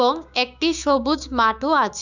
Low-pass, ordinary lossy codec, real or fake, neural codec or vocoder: 7.2 kHz; none; fake; codec, 16 kHz, 6 kbps, DAC